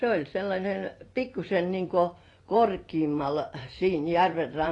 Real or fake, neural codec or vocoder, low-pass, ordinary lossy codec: fake; vocoder, 24 kHz, 100 mel bands, Vocos; 10.8 kHz; AAC, 32 kbps